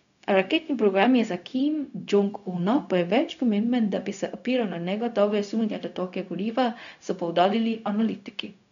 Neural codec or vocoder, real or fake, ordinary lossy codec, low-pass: codec, 16 kHz, 0.4 kbps, LongCat-Audio-Codec; fake; none; 7.2 kHz